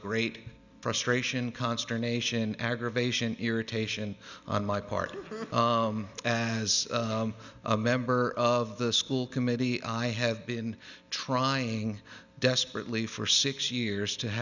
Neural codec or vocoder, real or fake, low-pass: none; real; 7.2 kHz